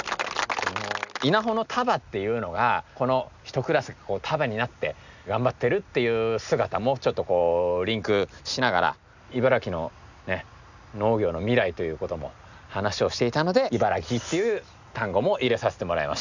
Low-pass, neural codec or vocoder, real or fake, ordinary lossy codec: 7.2 kHz; none; real; none